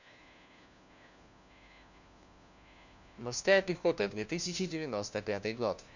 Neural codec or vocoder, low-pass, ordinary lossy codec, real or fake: codec, 16 kHz, 1 kbps, FunCodec, trained on LibriTTS, 50 frames a second; 7.2 kHz; none; fake